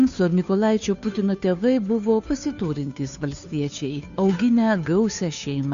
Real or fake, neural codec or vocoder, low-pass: fake; codec, 16 kHz, 2 kbps, FunCodec, trained on Chinese and English, 25 frames a second; 7.2 kHz